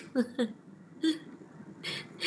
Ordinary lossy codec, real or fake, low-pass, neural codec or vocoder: none; fake; none; vocoder, 22.05 kHz, 80 mel bands, HiFi-GAN